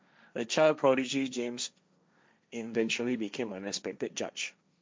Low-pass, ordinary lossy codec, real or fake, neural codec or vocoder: none; none; fake; codec, 16 kHz, 1.1 kbps, Voila-Tokenizer